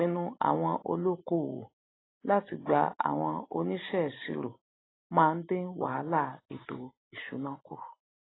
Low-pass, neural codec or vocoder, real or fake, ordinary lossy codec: 7.2 kHz; none; real; AAC, 16 kbps